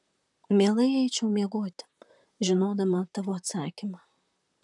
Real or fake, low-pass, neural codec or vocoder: fake; 10.8 kHz; vocoder, 44.1 kHz, 128 mel bands, Pupu-Vocoder